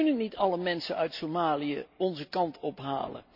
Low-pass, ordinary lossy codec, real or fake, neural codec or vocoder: 5.4 kHz; none; real; none